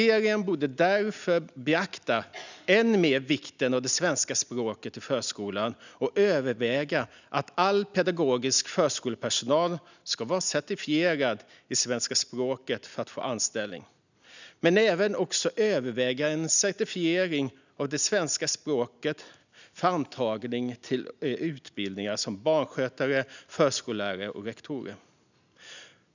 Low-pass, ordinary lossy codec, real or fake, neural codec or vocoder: 7.2 kHz; none; real; none